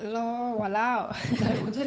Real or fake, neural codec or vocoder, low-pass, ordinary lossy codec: fake; codec, 16 kHz, 8 kbps, FunCodec, trained on Chinese and English, 25 frames a second; none; none